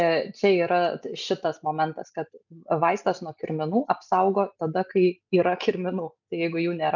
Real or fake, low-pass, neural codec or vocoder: real; 7.2 kHz; none